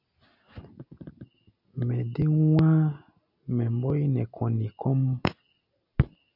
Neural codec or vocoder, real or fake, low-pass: none; real; 5.4 kHz